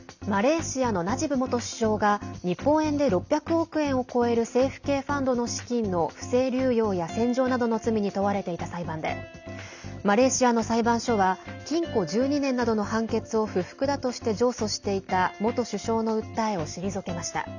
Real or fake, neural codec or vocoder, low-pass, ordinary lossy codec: real; none; 7.2 kHz; none